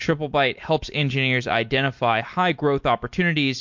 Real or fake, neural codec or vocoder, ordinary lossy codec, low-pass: real; none; MP3, 48 kbps; 7.2 kHz